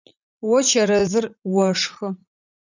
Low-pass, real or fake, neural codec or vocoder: 7.2 kHz; fake; vocoder, 44.1 kHz, 80 mel bands, Vocos